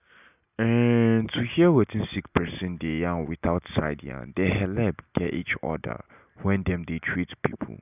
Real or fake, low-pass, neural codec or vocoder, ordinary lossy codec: real; 3.6 kHz; none; none